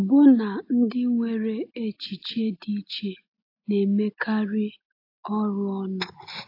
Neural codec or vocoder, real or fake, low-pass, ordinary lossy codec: none; real; 5.4 kHz; AAC, 32 kbps